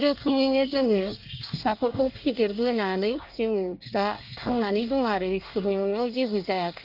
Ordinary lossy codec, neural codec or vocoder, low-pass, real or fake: Opus, 32 kbps; codec, 24 kHz, 1 kbps, SNAC; 5.4 kHz; fake